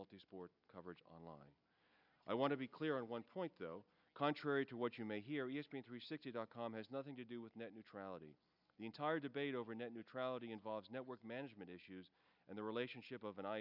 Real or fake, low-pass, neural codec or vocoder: real; 5.4 kHz; none